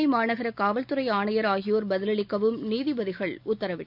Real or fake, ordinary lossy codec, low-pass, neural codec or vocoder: real; none; 5.4 kHz; none